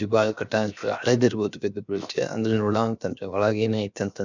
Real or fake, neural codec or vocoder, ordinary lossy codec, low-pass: fake; codec, 16 kHz, 0.7 kbps, FocalCodec; none; 7.2 kHz